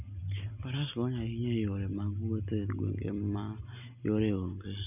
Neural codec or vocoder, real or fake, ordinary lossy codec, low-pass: none; real; none; 3.6 kHz